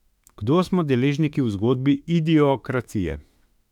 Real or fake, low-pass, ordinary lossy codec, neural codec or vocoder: fake; 19.8 kHz; none; autoencoder, 48 kHz, 32 numbers a frame, DAC-VAE, trained on Japanese speech